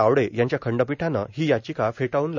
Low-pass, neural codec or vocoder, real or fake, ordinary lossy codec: 7.2 kHz; none; real; none